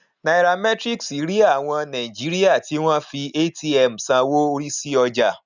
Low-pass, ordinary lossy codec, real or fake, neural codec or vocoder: 7.2 kHz; none; real; none